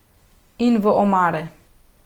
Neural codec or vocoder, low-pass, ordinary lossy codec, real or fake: none; 19.8 kHz; Opus, 24 kbps; real